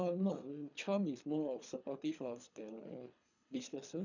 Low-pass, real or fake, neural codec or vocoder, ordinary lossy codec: 7.2 kHz; fake; codec, 24 kHz, 3 kbps, HILCodec; none